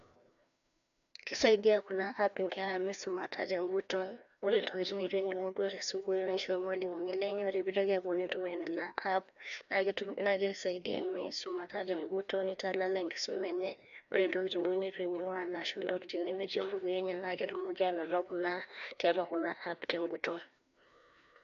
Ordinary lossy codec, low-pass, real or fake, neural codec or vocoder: none; 7.2 kHz; fake; codec, 16 kHz, 1 kbps, FreqCodec, larger model